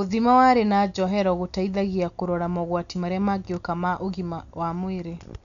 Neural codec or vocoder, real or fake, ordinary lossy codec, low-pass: none; real; none; 7.2 kHz